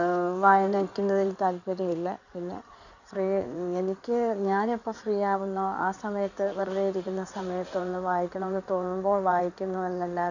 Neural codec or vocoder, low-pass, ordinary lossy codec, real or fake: codec, 16 kHz in and 24 kHz out, 2.2 kbps, FireRedTTS-2 codec; 7.2 kHz; none; fake